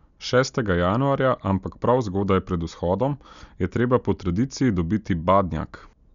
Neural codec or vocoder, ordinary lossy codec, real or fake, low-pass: none; none; real; 7.2 kHz